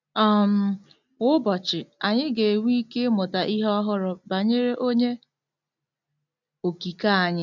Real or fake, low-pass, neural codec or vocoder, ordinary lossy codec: real; 7.2 kHz; none; none